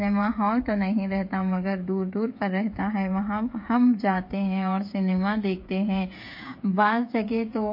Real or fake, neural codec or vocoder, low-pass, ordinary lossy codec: fake; codec, 16 kHz, 16 kbps, FreqCodec, smaller model; 5.4 kHz; MP3, 32 kbps